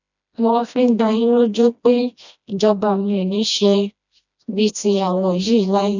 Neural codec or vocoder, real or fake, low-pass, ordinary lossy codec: codec, 16 kHz, 1 kbps, FreqCodec, smaller model; fake; 7.2 kHz; none